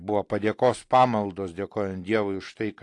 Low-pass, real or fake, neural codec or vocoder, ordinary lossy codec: 10.8 kHz; real; none; AAC, 48 kbps